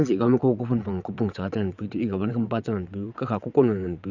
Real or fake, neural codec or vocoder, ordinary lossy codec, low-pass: fake; vocoder, 22.05 kHz, 80 mel bands, WaveNeXt; none; 7.2 kHz